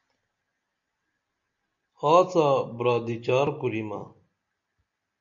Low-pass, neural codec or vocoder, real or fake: 7.2 kHz; none; real